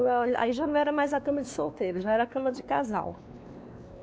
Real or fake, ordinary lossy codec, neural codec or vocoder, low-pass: fake; none; codec, 16 kHz, 2 kbps, X-Codec, WavLM features, trained on Multilingual LibriSpeech; none